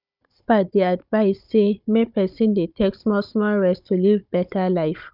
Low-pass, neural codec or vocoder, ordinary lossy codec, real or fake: 5.4 kHz; codec, 16 kHz, 16 kbps, FunCodec, trained on Chinese and English, 50 frames a second; none; fake